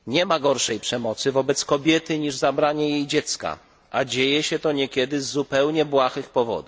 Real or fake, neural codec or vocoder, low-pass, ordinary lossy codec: real; none; none; none